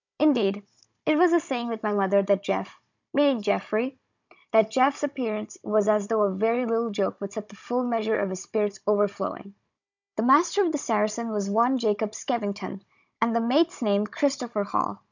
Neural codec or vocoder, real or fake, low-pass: codec, 16 kHz, 16 kbps, FunCodec, trained on Chinese and English, 50 frames a second; fake; 7.2 kHz